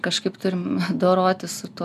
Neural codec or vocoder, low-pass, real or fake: none; 14.4 kHz; real